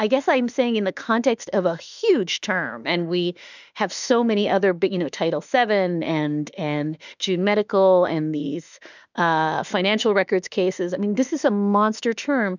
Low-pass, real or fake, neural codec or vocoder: 7.2 kHz; fake; autoencoder, 48 kHz, 32 numbers a frame, DAC-VAE, trained on Japanese speech